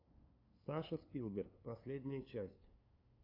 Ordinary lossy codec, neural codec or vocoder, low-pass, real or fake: AAC, 32 kbps; codec, 16 kHz, 2 kbps, FunCodec, trained on LibriTTS, 25 frames a second; 5.4 kHz; fake